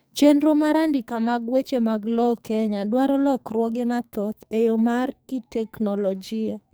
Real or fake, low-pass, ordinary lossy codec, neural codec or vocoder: fake; none; none; codec, 44.1 kHz, 2.6 kbps, SNAC